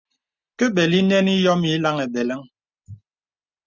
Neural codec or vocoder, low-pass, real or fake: none; 7.2 kHz; real